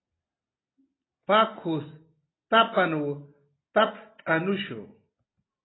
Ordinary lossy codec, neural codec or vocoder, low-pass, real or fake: AAC, 16 kbps; none; 7.2 kHz; real